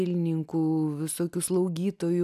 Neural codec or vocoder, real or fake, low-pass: none; real; 14.4 kHz